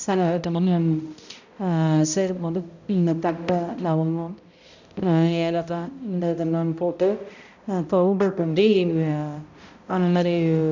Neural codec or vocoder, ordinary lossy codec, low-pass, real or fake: codec, 16 kHz, 0.5 kbps, X-Codec, HuBERT features, trained on balanced general audio; none; 7.2 kHz; fake